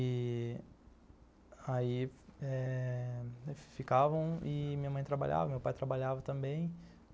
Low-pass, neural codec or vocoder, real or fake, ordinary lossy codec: none; none; real; none